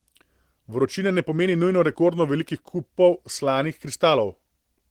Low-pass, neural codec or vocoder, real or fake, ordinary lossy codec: 19.8 kHz; none; real; Opus, 16 kbps